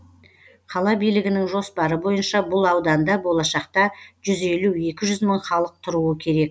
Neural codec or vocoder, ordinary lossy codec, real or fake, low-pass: none; none; real; none